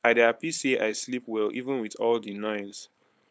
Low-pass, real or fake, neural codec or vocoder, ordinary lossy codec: none; fake; codec, 16 kHz, 4.8 kbps, FACodec; none